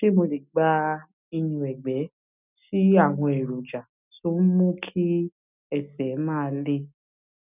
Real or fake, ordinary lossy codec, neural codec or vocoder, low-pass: real; none; none; 3.6 kHz